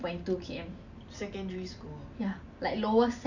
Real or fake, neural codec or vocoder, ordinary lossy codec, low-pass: real; none; none; 7.2 kHz